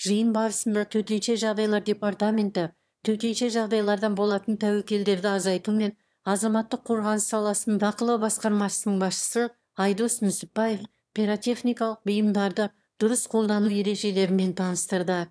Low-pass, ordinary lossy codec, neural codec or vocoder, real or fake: none; none; autoencoder, 22.05 kHz, a latent of 192 numbers a frame, VITS, trained on one speaker; fake